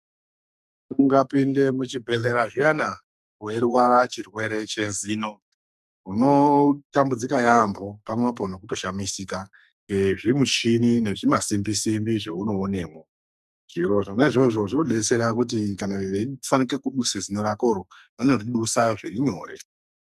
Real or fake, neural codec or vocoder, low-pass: fake; codec, 44.1 kHz, 2.6 kbps, SNAC; 14.4 kHz